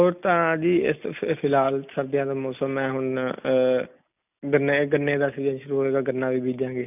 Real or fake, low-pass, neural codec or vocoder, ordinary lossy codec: real; 3.6 kHz; none; none